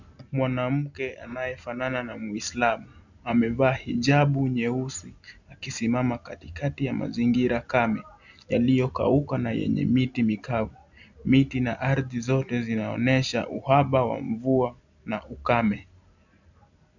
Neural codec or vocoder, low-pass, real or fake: none; 7.2 kHz; real